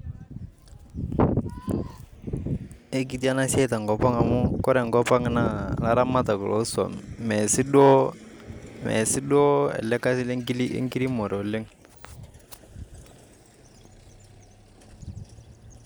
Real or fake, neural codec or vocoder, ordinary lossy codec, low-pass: real; none; none; none